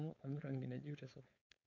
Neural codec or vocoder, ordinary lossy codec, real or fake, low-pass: codec, 16 kHz, 4.8 kbps, FACodec; none; fake; 7.2 kHz